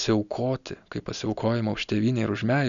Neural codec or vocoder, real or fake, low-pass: none; real; 7.2 kHz